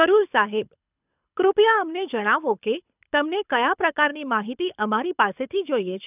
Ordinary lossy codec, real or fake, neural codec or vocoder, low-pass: none; fake; codec, 24 kHz, 6 kbps, HILCodec; 3.6 kHz